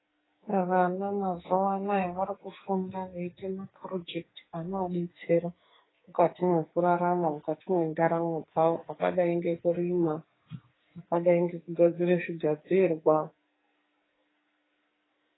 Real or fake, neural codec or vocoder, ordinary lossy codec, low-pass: fake; codec, 44.1 kHz, 2.6 kbps, SNAC; AAC, 16 kbps; 7.2 kHz